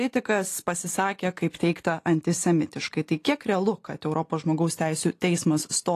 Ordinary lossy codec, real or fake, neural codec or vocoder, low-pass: AAC, 48 kbps; real; none; 14.4 kHz